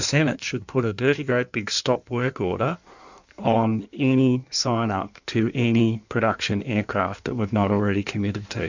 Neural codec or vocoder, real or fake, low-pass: codec, 16 kHz in and 24 kHz out, 1.1 kbps, FireRedTTS-2 codec; fake; 7.2 kHz